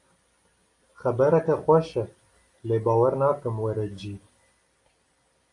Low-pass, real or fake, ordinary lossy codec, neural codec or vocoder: 10.8 kHz; real; AAC, 48 kbps; none